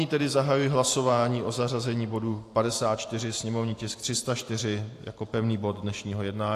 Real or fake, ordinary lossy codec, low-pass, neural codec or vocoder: fake; AAC, 64 kbps; 14.4 kHz; vocoder, 44.1 kHz, 128 mel bands every 512 samples, BigVGAN v2